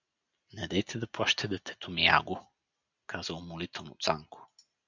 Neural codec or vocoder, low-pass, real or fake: none; 7.2 kHz; real